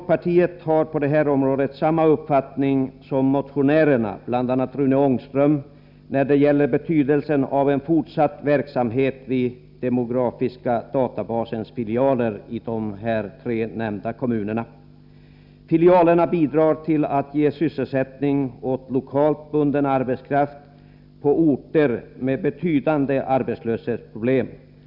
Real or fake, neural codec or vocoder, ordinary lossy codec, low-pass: real; none; none; 5.4 kHz